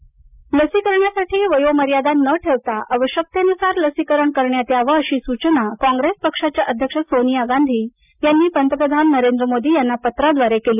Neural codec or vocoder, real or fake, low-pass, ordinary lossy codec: none; real; 3.6 kHz; none